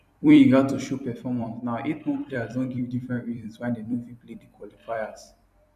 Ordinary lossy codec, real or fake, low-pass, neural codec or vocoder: none; fake; 14.4 kHz; vocoder, 44.1 kHz, 128 mel bands every 256 samples, BigVGAN v2